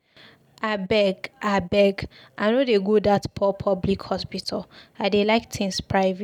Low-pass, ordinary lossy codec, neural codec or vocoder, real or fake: 19.8 kHz; none; none; real